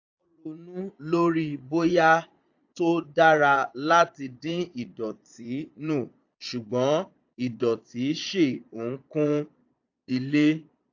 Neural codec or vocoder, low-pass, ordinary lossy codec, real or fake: vocoder, 22.05 kHz, 80 mel bands, Vocos; 7.2 kHz; AAC, 48 kbps; fake